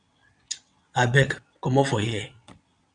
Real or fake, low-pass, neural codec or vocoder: fake; 9.9 kHz; vocoder, 22.05 kHz, 80 mel bands, WaveNeXt